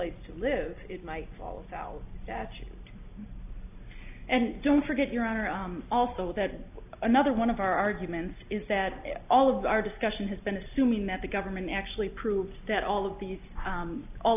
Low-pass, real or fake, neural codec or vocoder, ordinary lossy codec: 3.6 kHz; real; none; AAC, 32 kbps